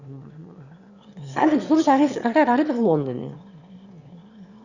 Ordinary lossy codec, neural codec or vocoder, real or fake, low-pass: Opus, 64 kbps; autoencoder, 22.05 kHz, a latent of 192 numbers a frame, VITS, trained on one speaker; fake; 7.2 kHz